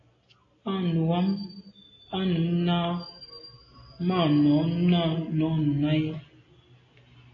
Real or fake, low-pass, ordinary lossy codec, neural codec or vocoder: real; 7.2 kHz; AAC, 32 kbps; none